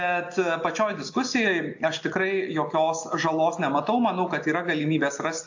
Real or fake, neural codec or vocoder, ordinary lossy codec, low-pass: real; none; AAC, 48 kbps; 7.2 kHz